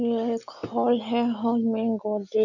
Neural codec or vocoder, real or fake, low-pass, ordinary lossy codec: none; real; 7.2 kHz; none